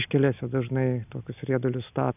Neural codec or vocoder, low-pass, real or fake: none; 3.6 kHz; real